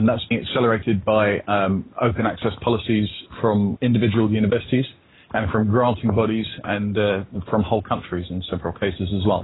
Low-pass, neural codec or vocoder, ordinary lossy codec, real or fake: 7.2 kHz; none; AAC, 16 kbps; real